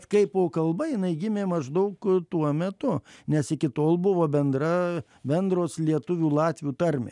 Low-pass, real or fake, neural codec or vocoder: 10.8 kHz; real; none